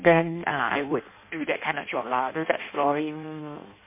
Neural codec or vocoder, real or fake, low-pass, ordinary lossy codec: codec, 16 kHz in and 24 kHz out, 0.6 kbps, FireRedTTS-2 codec; fake; 3.6 kHz; MP3, 24 kbps